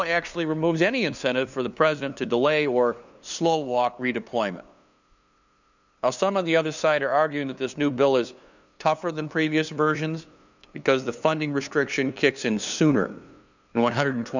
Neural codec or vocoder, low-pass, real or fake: codec, 16 kHz, 2 kbps, FunCodec, trained on LibriTTS, 25 frames a second; 7.2 kHz; fake